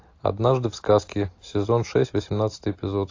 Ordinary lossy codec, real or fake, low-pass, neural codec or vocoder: AAC, 48 kbps; real; 7.2 kHz; none